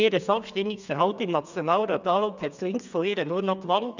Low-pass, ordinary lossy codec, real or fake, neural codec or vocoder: 7.2 kHz; none; fake; codec, 32 kHz, 1.9 kbps, SNAC